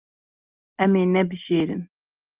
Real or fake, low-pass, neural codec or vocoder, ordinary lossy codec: real; 3.6 kHz; none; Opus, 24 kbps